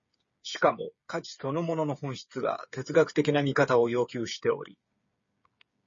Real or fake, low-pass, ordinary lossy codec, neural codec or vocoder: fake; 7.2 kHz; MP3, 32 kbps; codec, 16 kHz, 8 kbps, FreqCodec, smaller model